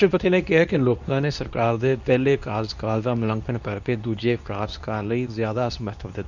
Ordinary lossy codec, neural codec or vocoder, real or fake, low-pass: none; codec, 24 kHz, 0.9 kbps, WavTokenizer, medium speech release version 2; fake; 7.2 kHz